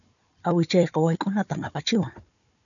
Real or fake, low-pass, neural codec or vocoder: fake; 7.2 kHz; codec, 16 kHz, 4 kbps, FunCodec, trained on Chinese and English, 50 frames a second